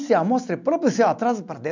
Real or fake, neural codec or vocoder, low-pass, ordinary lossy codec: real; none; 7.2 kHz; none